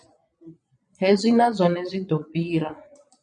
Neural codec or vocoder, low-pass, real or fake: vocoder, 22.05 kHz, 80 mel bands, Vocos; 9.9 kHz; fake